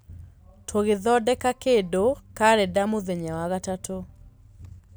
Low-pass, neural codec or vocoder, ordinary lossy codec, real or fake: none; none; none; real